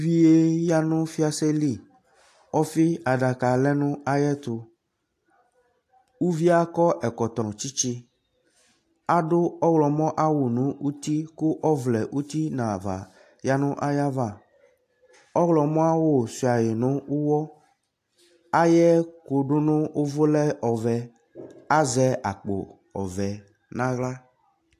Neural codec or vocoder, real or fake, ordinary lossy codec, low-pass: none; real; AAC, 64 kbps; 14.4 kHz